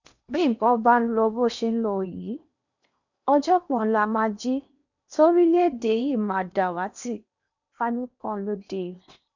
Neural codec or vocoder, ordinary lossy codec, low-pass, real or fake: codec, 16 kHz in and 24 kHz out, 0.8 kbps, FocalCodec, streaming, 65536 codes; none; 7.2 kHz; fake